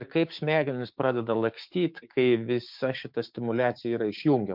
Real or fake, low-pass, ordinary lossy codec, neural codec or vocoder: fake; 5.4 kHz; MP3, 48 kbps; codec, 16 kHz, 6 kbps, DAC